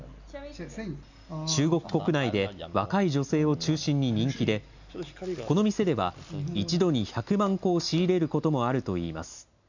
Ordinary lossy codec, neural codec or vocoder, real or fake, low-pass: none; none; real; 7.2 kHz